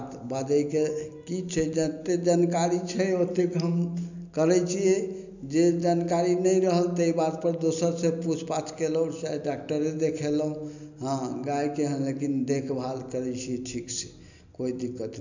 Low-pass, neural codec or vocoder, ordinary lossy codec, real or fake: 7.2 kHz; none; none; real